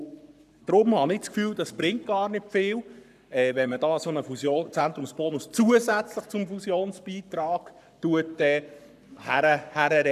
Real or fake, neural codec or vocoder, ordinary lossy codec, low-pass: fake; codec, 44.1 kHz, 7.8 kbps, Pupu-Codec; none; 14.4 kHz